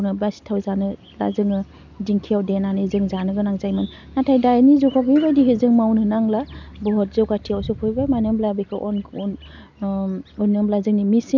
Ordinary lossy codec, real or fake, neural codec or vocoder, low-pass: none; real; none; 7.2 kHz